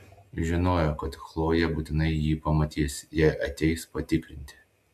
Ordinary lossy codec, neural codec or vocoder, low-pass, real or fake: AAC, 96 kbps; none; 14.4 kHz; real